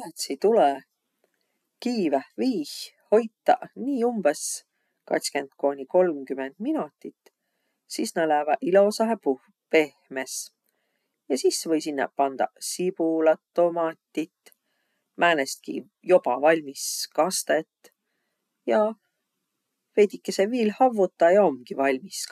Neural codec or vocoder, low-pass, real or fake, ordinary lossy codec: none; 14.4 kHz; real; none